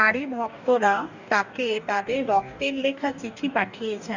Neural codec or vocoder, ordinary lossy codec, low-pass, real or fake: codec, 44.1 kHz, 2.6 kbps, DAC; none; 7.2 kHz; fake